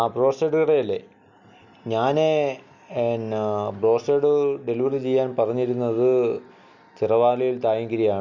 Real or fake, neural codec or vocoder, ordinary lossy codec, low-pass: real; none; none; 7.2 kHz